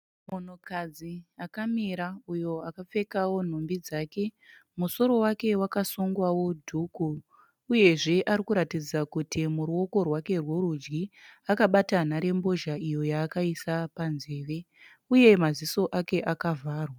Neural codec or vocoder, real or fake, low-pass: none; real; 19.8 kHz